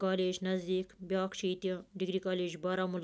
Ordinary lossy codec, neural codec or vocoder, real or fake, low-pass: none; none; real; none